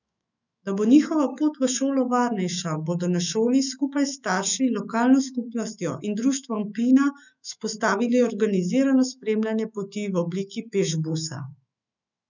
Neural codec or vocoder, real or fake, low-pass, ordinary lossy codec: autoencoder, 48 kHz, 128 numbers a frame, DAC-VAE, trained on Japanese speech; fake; 7.2 kHz; none